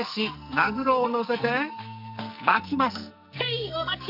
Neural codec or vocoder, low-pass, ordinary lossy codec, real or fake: codec, 44.1 kHz, 2.6 kbps, SNAC; 5.4 kHz; none; fake